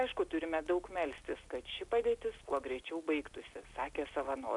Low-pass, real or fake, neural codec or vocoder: 10.8 kHz; real; none